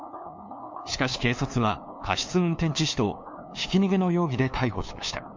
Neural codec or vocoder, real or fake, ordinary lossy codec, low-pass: codec, 16 kHz, 2 kbps, FunCodec, trained on LibriTTS, 25 frames a second; fake; MP3, 48 kbps; 7.2 kHz